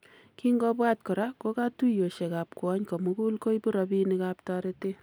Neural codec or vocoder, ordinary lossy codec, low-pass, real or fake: none; none; none; real